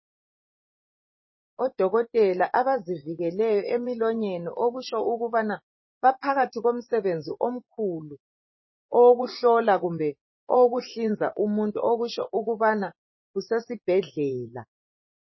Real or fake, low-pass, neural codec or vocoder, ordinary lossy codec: fake; 7.2 kHz; vocoder, 24 kHz, 100 mel bands, Vocos; MP3, 24 kbps